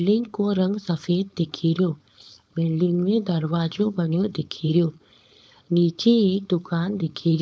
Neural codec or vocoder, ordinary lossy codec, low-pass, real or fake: codec, 16 kHz, 4.8 kbps, FACodec; none; none; fake